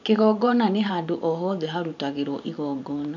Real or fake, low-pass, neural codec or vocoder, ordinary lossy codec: fake; 7.2 kHz; vocoder, 44.1 kHz, 128 mel bands every 512 samples, BigVGAN v2; none